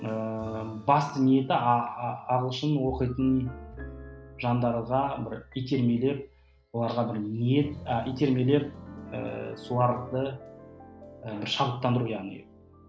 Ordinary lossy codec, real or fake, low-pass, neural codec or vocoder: none; real; none; none